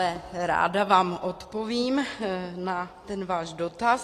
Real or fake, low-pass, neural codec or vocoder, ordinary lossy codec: real; 14.4 kHz; none; AAC, 48 kbps